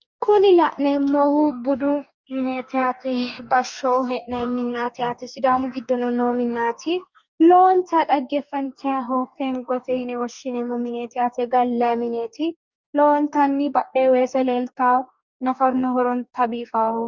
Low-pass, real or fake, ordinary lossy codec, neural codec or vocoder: 7.2 kHz; fake; Opus, 64 kbps; codec, 44.1 kHz, 2.6 kbps, DAC